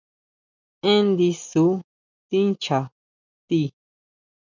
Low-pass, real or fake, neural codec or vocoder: 7.2 kHz; real; none